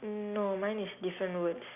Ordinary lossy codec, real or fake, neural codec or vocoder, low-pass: none; real; none; 3.6 kHz